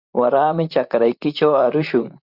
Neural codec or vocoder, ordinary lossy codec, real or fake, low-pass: vocoder, 44.1 kHz, 128 mel bands, Pupu-Vocoder; Opus, 64 kbps; fake; 5.4 kHz